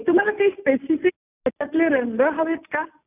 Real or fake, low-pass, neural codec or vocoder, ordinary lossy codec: real; 3.6 kHz; none; none